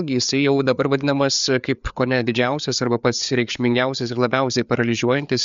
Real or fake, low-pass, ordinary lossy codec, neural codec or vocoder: fake; 7.2 kHz; MP3, 64 kbps; codec, 16 kHz, 4 kbps, FreqCodec, larger model